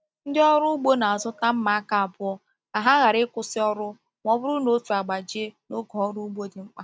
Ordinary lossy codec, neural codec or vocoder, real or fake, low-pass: none; none; real; none